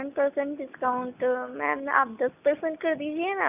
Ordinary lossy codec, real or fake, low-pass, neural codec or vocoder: none; real; 3.6 kHz; none